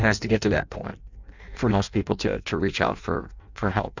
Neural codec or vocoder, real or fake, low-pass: codec, 16 kHz in and 24 kHz out, 0.6 kbps, FireRedTTS-2 codec; fake; 7.2 kHz